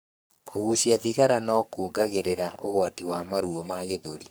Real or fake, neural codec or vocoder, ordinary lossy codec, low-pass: fake; codec, 44.1 kHz, 3.4 kbps, Pupu-Codec; none; none